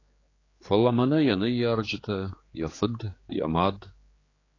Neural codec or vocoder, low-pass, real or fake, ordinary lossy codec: codec, 16 kHz, 4 kbps, X-Codec, HuBERT features, trained on general audio; 7.2 kHz; fake; AAC, 32 kbps